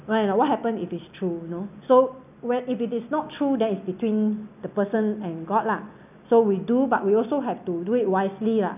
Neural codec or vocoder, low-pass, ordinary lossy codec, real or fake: none; 3.6 kHz; none; real